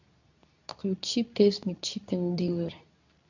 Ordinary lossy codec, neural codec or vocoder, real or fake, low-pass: none; codec, 24 kHz, 0.9 kbps, WavTokenizer, medium speech release version 2; fake; 7.2 kHz